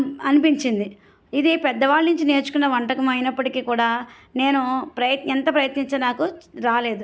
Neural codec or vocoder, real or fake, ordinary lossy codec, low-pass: none; real; none; none